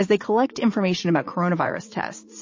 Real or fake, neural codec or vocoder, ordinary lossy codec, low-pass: fake; vocoder, 44.1 kHz, 80 mel bands, Vocos; MP3, 32 kbps; 7.2 kHz